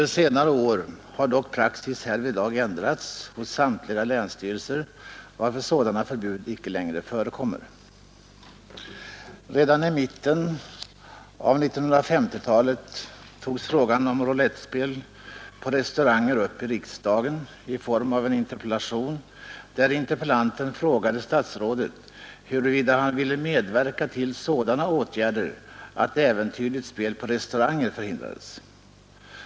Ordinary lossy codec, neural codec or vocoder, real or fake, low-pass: none; none; real; none